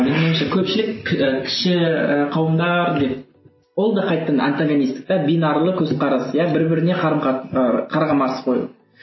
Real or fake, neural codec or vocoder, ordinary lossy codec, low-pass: real; none; MP3, 24 kbps; 7.2 kHz